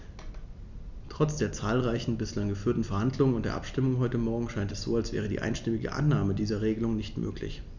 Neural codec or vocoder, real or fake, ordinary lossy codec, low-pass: none; real; none; 7.2 kHz